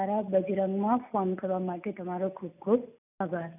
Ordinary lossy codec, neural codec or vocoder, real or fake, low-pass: none; none; real; 3.6 kHz